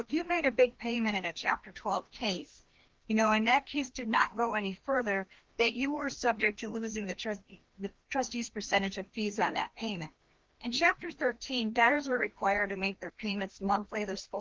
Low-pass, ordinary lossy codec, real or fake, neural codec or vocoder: 7.2 kHz; Opus, 16 kbps; fake; codec, 16 kHz, 1 kbps, FreqCodec, larger model